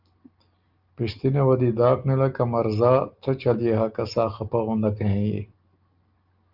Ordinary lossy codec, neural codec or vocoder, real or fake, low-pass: Opus, 24 kbps; none; real; 5.4 kHz